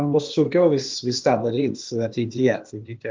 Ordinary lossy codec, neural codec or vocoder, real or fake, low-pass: Opus, 32 kbps; codec, 16 kHz, 0.8 kbps, ZipCodec; fake; 7.2 kHz